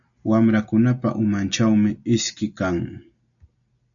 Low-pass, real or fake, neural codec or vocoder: 7.2 kHz; real; none